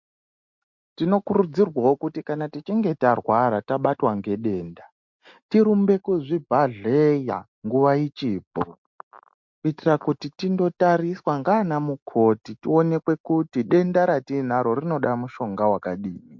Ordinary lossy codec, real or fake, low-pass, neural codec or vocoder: MP3, 48 kbps; real; 7.2 kHz; none